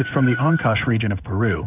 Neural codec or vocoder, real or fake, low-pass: codec, 16 kHz in and 24 kHz out, 1 kbps, XY-Tokenizer; fake; 3.6 kHz